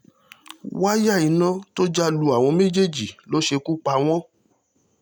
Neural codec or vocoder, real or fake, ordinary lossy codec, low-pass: none; real; none; none